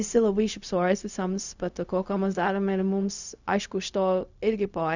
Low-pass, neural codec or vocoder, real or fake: 7.2 kHz; codec, 16 kHz, 0.4 kbps, LongCat-Audio-Codec; fake